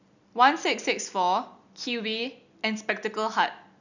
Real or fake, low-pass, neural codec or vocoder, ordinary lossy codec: real; 7.2 kHz; none; none